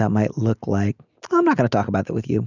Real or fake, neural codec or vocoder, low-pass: real; none; 7.2 kHz